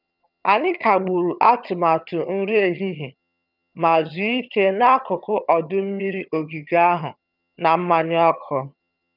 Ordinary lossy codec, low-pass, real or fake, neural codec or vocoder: none; 5.4 kHz; fake; vocoder, 22.05 kHz, 80 mel bands, HiFi-GAN